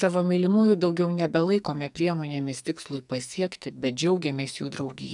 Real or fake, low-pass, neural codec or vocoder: fake; 10.8 kHz; codec, 44.1 kHz, 2.6 kbps, SNAC